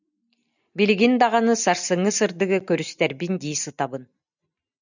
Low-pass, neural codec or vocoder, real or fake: 7.2 kHz; none; real